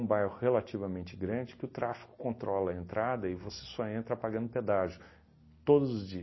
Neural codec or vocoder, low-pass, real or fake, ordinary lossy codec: none; 7.2 kHz; real; MP3, 24 kbps